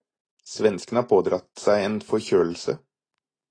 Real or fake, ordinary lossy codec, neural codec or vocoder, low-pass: real; AAC, 32 kbps; none; 9.9 kHz